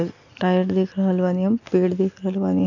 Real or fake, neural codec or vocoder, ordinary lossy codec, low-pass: real; none; none; 7.2 kHz